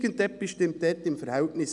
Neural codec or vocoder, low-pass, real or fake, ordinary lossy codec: none; 10.8 kHz; real; none